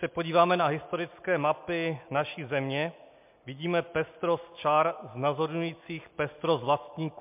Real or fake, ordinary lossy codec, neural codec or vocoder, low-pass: real; MP3, 32 kbps; none; 3.6 kHz